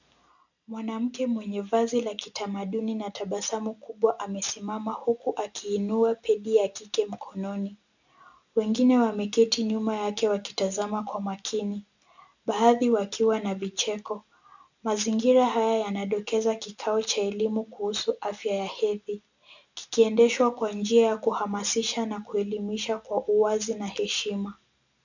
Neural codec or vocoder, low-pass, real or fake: none; 7.2 kHz; real